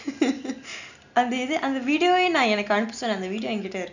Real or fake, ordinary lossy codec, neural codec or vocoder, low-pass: real; none; none; 7.2 kHz